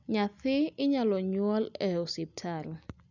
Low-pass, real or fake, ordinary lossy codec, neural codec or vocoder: 7.2 kHz; real; none; none